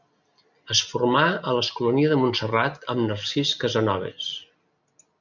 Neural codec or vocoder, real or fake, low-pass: none; real; 7.2 kHz